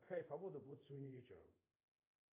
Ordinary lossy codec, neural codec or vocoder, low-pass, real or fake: AAC, 24 kbps; codec, 24 kHz, 0.5 kbps, DualCodec; 3.6 kHz; fake